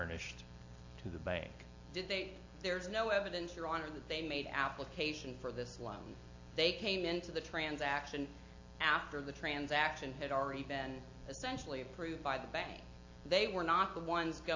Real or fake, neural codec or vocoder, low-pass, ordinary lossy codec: real; none; 7.2 kHz; AAC, 48 kbps